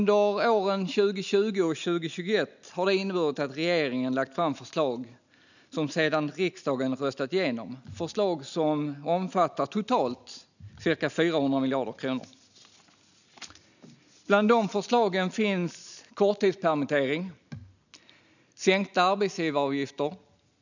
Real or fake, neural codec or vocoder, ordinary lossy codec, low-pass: real; none; none; 7.2 kHz